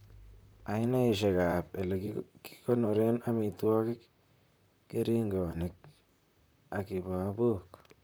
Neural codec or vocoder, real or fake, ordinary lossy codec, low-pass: vocoder, 44.1 kHz, 128 mel bands, Pupu-Vocoder; fake; none; none